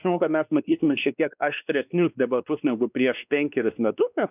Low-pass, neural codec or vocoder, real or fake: 3.6 kHz; codec, 16 kHz, 2 kbps, X-Codec, WavLM features, trained on Multilingual LibriSpeech; fake